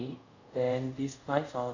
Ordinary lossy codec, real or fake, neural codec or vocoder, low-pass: none; fake; codec, 24 kHz, 0.5 kbps, DualCodec; 7.2 kHz